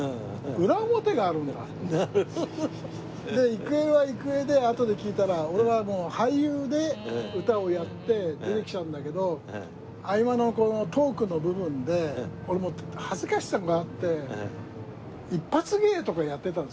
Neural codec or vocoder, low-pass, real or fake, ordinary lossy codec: none; none; real; none